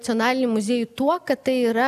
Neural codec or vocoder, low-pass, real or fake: none; 14.4 kHz; real